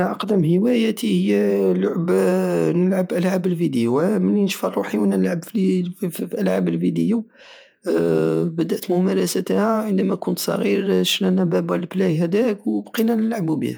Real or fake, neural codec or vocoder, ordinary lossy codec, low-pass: fake; vocoder, 48 kHz, 128 mel bands, Vocos; none; none